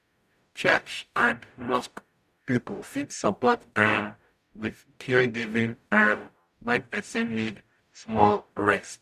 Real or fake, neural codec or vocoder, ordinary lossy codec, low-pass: fake; codec, 44.1 kHz, 0.9 kbps, DAC; none; 14.4 kHz